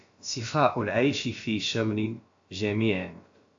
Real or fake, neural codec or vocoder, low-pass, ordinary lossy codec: fake; codec, 16 kHz, about 1 kbps, DyCAST, with the encoder's durations; 7.2 kHz; AAC, 48 kbps